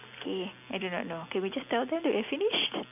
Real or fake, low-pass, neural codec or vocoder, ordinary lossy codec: real; 3.6 kHz; none; none